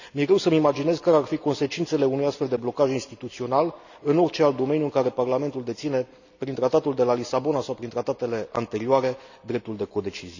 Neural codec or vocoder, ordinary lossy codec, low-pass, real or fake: none; none; 7.2 kHz; real